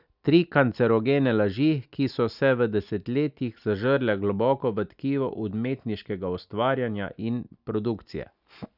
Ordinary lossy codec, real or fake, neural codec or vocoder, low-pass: none; real; none; 5.4 kHz